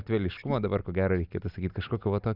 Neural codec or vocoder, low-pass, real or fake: none; 5.4 kHz; real